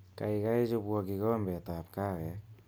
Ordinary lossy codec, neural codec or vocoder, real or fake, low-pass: none; none; real; none